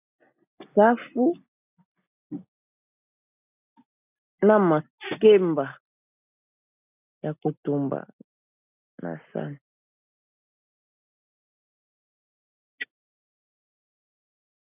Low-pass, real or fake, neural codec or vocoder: 3.6 kHz; real; none